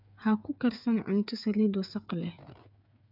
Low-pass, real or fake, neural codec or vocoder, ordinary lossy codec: 5.4 kHz; fake; codec, 16 kHz, 8 kbps, FreqCodec, smaller model; none